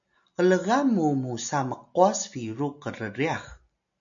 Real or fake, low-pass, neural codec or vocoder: real; 7.2 kHz; none